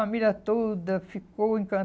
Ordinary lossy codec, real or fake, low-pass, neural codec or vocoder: none; real; none; none